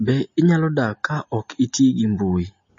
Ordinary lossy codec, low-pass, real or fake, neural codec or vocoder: MP3, 32 kbps; 7.2 kHz; real; none